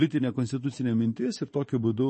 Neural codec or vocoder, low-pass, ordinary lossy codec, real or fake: vocoder, 24 kHz, 100 mel bands, Vocos; 10.8 kHz; MP3, 32 kbps; fake